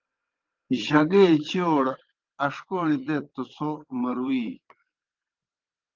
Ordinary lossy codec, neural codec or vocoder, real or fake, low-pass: Opus, 32 kbps; vocoder, 22.05 kHz, 80 mel bands, WaveNeXt; fake; 7.2 kHz